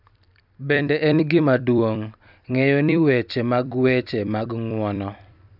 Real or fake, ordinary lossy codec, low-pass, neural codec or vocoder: fake; none; 5.4 kHz; vocoder, 44.1 kHz, 128 mel bands every 256 samples, BigVGAN v2